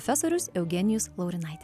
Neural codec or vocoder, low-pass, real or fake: none; 14.4 kHz; real